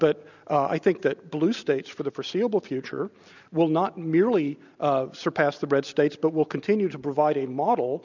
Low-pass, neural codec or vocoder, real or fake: 7.2 kHz; none; real